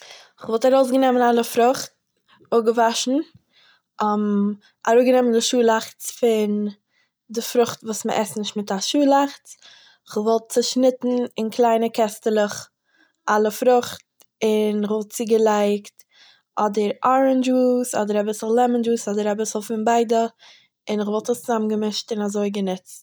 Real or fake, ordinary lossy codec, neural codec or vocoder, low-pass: real; none; none; none